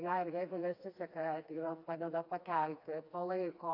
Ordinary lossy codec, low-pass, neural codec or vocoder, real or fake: AAC, 48 kbps; 5.4 kHz; codec, 16 kHz, 2 kbps, FreqCodec, smaller model; fake